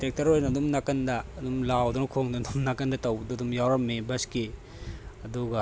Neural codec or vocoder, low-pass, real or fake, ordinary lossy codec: none; none; real; none